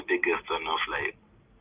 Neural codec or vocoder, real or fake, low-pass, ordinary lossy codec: none; real; 3.6 kHz; Opus, 24 kbps